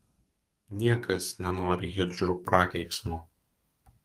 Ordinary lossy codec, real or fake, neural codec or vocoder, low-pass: Opus, 32 kbps; fake; codec, 32 kHz, 1.9 kbps, SNAC; 14.4 kHz